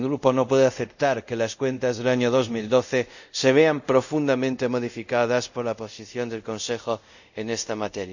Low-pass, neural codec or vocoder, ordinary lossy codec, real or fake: 7.2 kHz; codec, 24 kHz, 0.5 kbps, DualCodec; none; fake